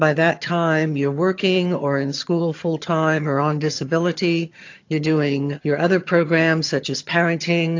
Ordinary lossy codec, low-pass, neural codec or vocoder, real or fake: AAC, 48 kbps; 7.2 kHz; vocoder, 22.05 kHz, 80 mel bands, HiFi-GAN; fake